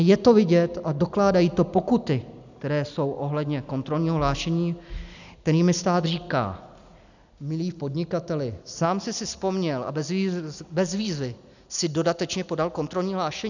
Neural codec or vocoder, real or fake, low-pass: none; real; 7.2 kHz